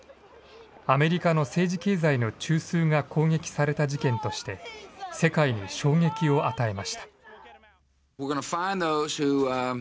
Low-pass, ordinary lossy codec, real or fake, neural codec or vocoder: none; none; real; none